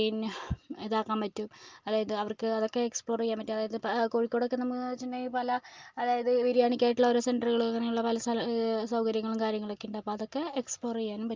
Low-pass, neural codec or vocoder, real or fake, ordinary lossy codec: 7.2 kHz; none; real; Opus, 32 kbps